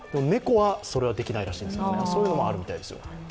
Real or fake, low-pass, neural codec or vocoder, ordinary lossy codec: real; none; none; none